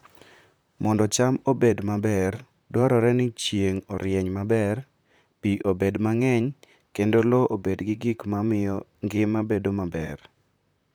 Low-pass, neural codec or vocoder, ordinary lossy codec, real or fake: none; vocoder, 44.1 kHz, 128 mel bands, Pupu-Vocoder; none; fake